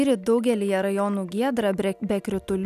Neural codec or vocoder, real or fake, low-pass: none; real; 14.4 kHz